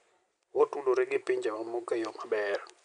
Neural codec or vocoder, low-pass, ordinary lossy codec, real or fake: none; 9.9 kHz; none; real